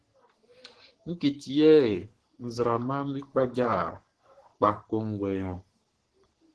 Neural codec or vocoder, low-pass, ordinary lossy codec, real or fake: codec, 44.1 kHz, 3.4 kbps, Pupu-Codec; 10.8 kHz; Opus, 16 kbps; fake